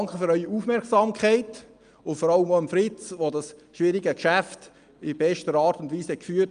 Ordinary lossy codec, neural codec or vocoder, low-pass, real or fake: Opus, 32 kbps; none; 9.9 kHz; real